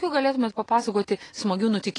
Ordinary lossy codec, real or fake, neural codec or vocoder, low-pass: AAC, 32 kbps; real; none; 10.8 kHz